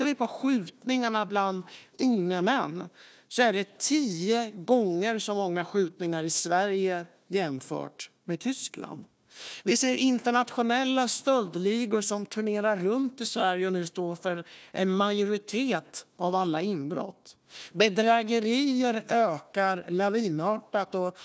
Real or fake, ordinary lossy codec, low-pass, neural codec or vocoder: fake; none; none; codec, 16 kHz, 1 kbps, FunCodec, trained on Chinese and English, 50 frames a second